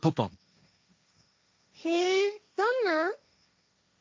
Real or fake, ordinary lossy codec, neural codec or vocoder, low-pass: fake; none; codec, 16 kHz, 1.1 kbps, Voila-Tokenizer; none